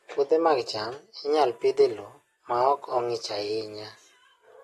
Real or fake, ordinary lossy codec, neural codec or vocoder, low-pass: real; AAC, 32 kbps; none; 19.8 kHz